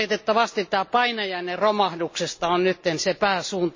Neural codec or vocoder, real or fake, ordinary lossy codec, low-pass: none; real; MP3, 32 kbps; 7.2 kHz